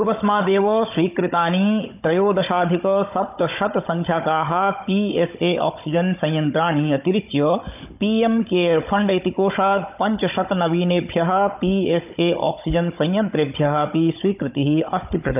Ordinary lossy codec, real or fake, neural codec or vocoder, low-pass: none; fake; codec, 16 kHz, 16 kbps, FunCodec, trained on Chinese and English, 50 frames a second; 3.6 kHz